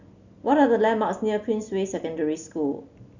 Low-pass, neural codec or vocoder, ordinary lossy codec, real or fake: 7.2 kHz; none; none; real